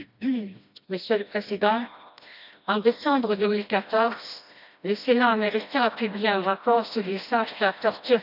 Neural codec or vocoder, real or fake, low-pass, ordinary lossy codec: codec, 16 kHz, 1 kbps, FreqCodec, smaller model; fake; 5.4 kHz; none